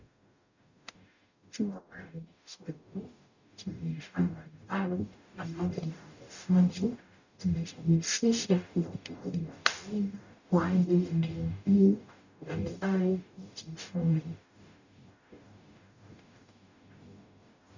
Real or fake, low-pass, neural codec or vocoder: fake; 7.2 kHz; codec, 44.1 kHz, 0.9 kbps, DAC